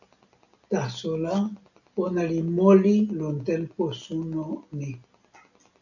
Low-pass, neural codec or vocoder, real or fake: 7.2 kHz; none; real